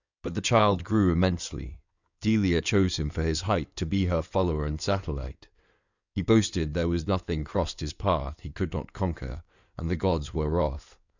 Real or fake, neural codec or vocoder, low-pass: fake; codec, 16 kHz in and 24 kHz out, 2.2 kbps, FireRedTTS-2 codec; 7.2 kHz